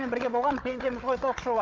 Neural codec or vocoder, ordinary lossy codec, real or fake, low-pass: codec, 16 kHz, 16 kbps, FunCodec, trained on Chinese and English, 50 frames a second; Opus, 24 kbps; fake; 7.2 kHz